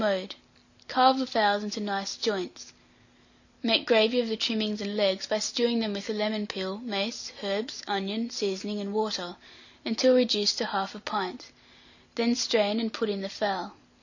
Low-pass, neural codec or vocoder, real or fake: 7.2 kHz; none; real